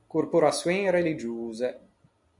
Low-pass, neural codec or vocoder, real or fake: 10.8 kHz; none; real